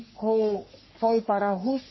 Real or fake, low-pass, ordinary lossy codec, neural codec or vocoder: fake; 7.2 kHz; MP3, 24 kbps; codec, 44.1 kHz, 3.4 kbps, Pupu-Codec